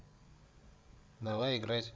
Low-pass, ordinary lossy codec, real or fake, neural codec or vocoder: none; none; fake; codec, 16 kHz, 16 kbps, FreqCodec, larger model